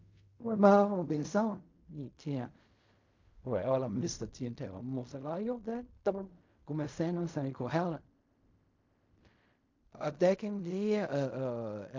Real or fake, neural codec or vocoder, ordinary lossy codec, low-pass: fake; codec, 16 kHz in and 24 kHz out, 0.4 kbps, LongCat-Audio-Codec, fine tuned four codebook decoder; MP3, 48 kbps; 7.2 kHz